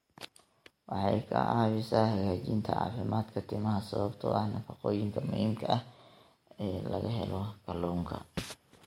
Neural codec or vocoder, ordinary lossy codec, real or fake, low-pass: none; MP3, 64 kbps; real; 19.8 kHz